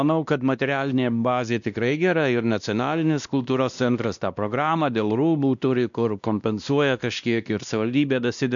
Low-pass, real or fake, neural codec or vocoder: 7.2 kHz; fake; codec, 16 kHz, 1 kbps, X-Codec, WavLM features, trained on Multilingual LibriSpeech